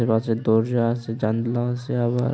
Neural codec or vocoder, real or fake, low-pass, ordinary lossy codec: none; real; none; none